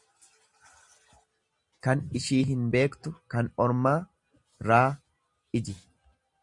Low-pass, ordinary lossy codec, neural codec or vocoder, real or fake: 10.8 kHz; Opus, 64 kbps; none; real